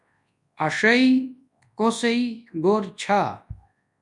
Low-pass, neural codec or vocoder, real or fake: 10.8 kHz; codec, 24 kHz, 0.9 kbps, WavTokenizer, large speech release; fake